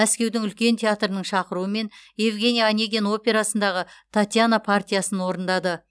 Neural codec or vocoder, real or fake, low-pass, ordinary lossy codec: none; real; none; none